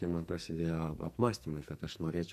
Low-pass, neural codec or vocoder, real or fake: 14.4 kHz; codec, 44.1 kHz, 2.6 kbps, SNAC; fake